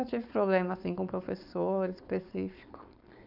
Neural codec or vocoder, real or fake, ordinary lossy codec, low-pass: codec, 16 kHz, 4.8 kbps, FACodec; fake; none; 5.4 kHz